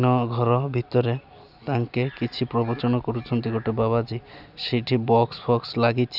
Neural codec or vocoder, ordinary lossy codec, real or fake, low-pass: none; none; real; 5.4 kHz